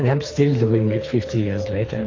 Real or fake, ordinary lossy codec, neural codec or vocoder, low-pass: fake; AAC, 32 kbps; codec, 24 kHz, 3 kbps, HILCodec; 7.2 kHz